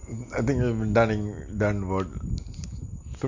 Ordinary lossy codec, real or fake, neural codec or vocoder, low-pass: MP3, 48 kbps; real; none; 7.2 kHz